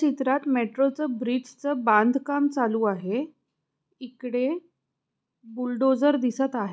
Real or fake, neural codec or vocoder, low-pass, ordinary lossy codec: real; none; none; none